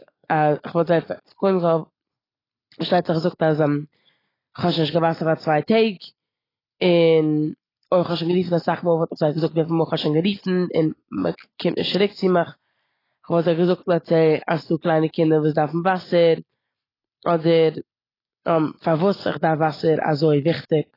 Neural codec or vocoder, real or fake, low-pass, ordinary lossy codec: none; real; 5.4 kHz; AAC, 24 kbps